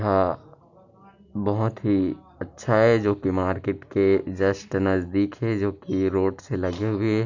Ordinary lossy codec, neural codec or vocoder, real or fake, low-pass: none; none; real; 7.2 kHz